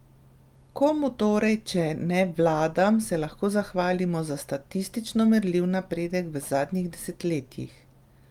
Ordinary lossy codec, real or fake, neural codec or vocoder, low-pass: Opus, 32 kbps; real; none; 19.8 kHz